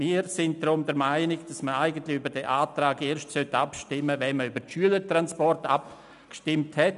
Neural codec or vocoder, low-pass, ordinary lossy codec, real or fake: none; 10.8 kHz; none; real